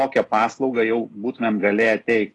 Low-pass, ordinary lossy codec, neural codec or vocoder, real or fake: 10.8 kHz; AAC, 32 kbps; none; real